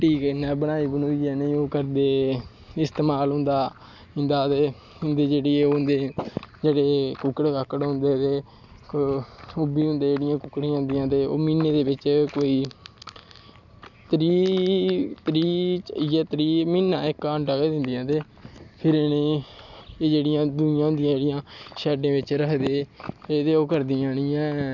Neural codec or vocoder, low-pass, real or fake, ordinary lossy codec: none; 7.2 kHz; real; none